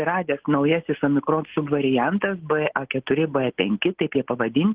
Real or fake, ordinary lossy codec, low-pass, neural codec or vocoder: real; Opus, 16 kbps; 3.6 kHz; none